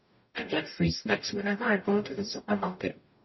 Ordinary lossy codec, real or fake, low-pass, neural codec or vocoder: MP3, 24 kbps; fake; 7.2 kHz; codec, 44.1 kHz, 0.9 kbps, DAC